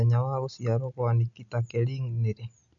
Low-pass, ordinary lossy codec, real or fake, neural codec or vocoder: 7.2 kHz; none; real; none